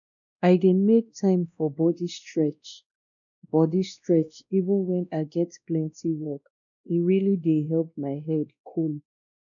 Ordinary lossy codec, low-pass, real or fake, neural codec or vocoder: none; 7.2 kHz; fake; codec, 16 kHz, 1 kbps, X-Codec, WavLM features, trained on Multilingual LibriSpeech